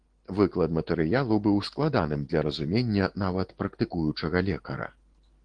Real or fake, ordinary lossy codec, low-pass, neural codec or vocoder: real; Opus, 16 kbps; 9.9 kHz; none